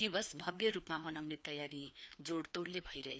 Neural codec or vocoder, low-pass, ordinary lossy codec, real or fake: codec, 16 kHz, 2 kbps, FreqCodec, larger model; none; none; fake